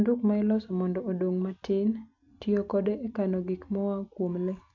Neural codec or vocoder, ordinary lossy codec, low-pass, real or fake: none; none; 7.2 kHz; real